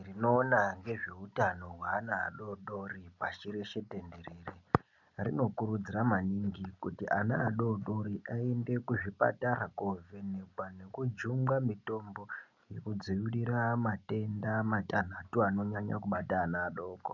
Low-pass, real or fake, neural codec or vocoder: 7.2 kHz; real; none